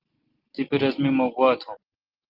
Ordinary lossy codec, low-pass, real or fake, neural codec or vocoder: Opus, 16 kbps; 5.4 kHz; real; none